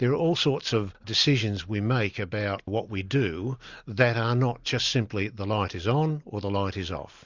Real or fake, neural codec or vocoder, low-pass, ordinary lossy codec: real; none; 7.2 kHz; Opus, 64 kbps